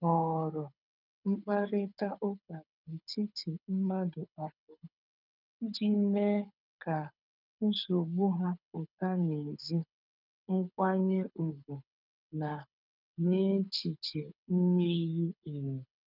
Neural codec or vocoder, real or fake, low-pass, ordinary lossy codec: codec, 24 kHz, 6 kbps, HILCodec; fake; 5.4 kHz; none